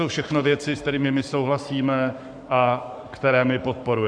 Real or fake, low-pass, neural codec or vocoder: fake; 9.9 kHz; codec, 44.1 kHz, 7.8 kbps, Pupu-Codec